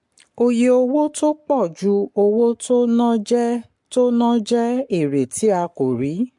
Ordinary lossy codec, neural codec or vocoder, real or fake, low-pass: MP3, 64 kbps; codec, 44.1 kHz, 7.8 kbps, Pupu-Codec; fake; 10.8 kHz